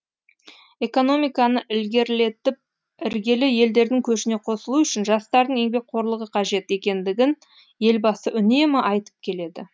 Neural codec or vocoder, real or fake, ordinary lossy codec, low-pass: none; real; none; none